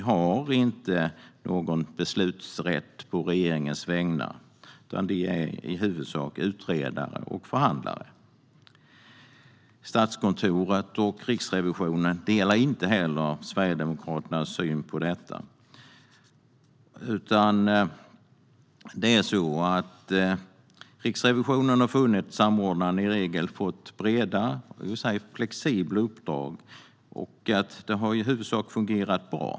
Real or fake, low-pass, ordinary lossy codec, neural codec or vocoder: real; none; none; none